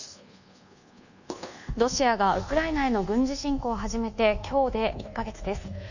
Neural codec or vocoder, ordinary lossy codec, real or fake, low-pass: codec, 24 kHz, 1.2 kbps, DualCodec; none; fake; 7.2 kHz